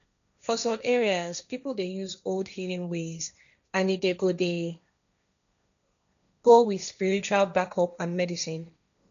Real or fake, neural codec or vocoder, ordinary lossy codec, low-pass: fake; codec, 16 kHz, 1.1 kbps, Voila-Tokenizer; none; 7.2 kHz